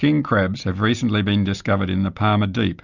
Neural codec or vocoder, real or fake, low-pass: none; real; 7.2 kHz